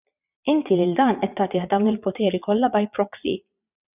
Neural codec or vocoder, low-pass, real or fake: vocoder, 22.05 kHz, 80 mel bands, Vocos; 3.6 kHz; fake